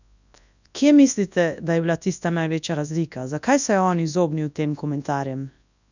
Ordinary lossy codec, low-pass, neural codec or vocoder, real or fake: none; 7.2 kHz; codec, 24 kHz, 0.9 kbps, WavTokenizer, large speech release; fake